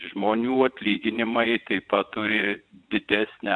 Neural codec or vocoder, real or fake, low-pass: vocoder, 22.05 kHz, 80 mel bands, WaveNeXt; fake; 9.9 kHz